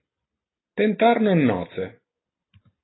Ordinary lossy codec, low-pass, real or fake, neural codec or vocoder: AAC, 16 kbps; 7.2 kHz; real; none